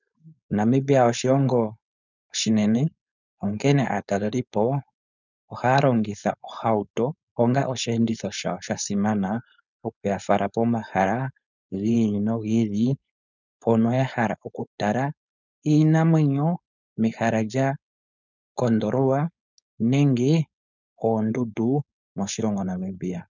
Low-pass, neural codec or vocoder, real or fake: 7.2 kHz; codec, 16 kHz, 4.8 kbps, FACodec; fake